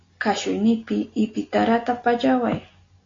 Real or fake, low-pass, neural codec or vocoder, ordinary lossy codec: real; 7.2 kHz; none; AAC, 32 kbps